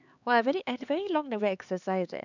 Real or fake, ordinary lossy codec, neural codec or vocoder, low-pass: fake; none; codec, 16 kHz, 4 kbps, X-Codec, HuBERT features, trained on LibriSpeech; 7.2 kHz